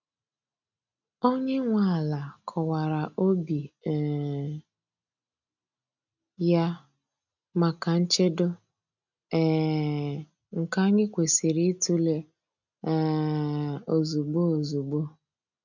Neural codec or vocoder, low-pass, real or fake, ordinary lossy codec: none; 7.2 kHz; real; none